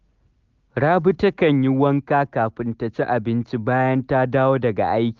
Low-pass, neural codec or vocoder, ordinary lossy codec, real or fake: 7.2 kHz; none; Opus, 32 kbps; real